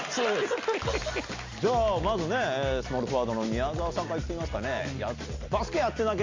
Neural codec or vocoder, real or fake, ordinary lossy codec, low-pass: none; real; MP3, 48 kbps; 7.2 kHz